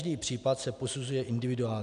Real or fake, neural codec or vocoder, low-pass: real; none; 10.8 kHz